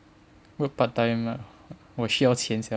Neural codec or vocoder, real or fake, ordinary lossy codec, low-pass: none; real; none; none